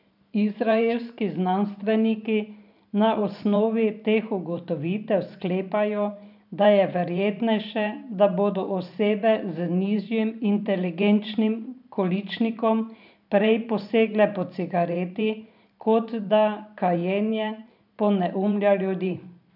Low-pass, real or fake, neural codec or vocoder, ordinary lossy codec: 5.4 kHz; fake; vocoder, 44.1 kHz, 128 mel bands every 512 samples, BigVGAN v2; none